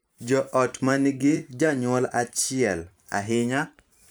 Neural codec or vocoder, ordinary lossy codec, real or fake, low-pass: vocoder, 44.1 kHz, 128 mel bands every 512 samples, BigVGAN v2; none; fake; none